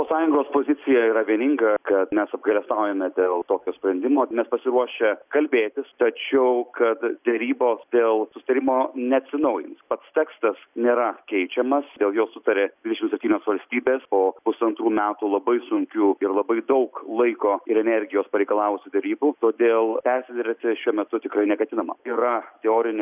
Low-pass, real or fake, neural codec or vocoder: 3.6 kHz; real; none